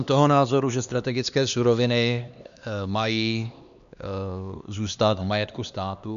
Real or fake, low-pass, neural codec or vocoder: fake; 7.2 kHz; codec, 16 kHz, 2 kbps, X-Codec, HuBERT features, trained on LibriSpeech